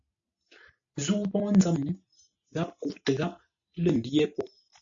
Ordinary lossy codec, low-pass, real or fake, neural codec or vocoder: AAC, 64 kbps; 7.2 kHz; real; none